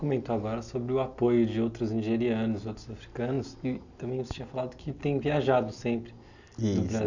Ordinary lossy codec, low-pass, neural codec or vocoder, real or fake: none; 7.2 kHz; none; real